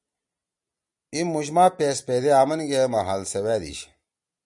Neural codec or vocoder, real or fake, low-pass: none; real; 10.8 kHz